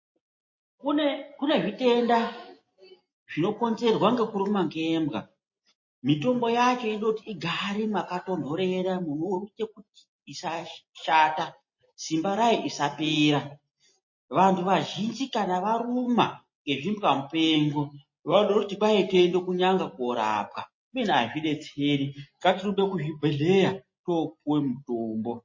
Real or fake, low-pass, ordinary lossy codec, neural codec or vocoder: real; 7.2 kHz; MP3, 32 kbps; none